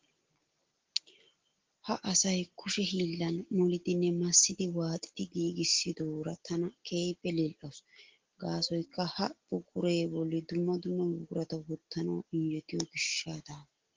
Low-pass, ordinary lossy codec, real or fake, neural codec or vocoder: 7.2 kHz; Opus, 16 kbps; real; none